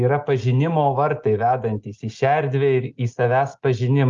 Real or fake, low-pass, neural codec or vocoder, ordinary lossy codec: real; 7.2 kHz; none; Opus, 32 kbps